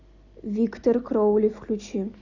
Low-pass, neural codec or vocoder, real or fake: 7.2 kHz; none; real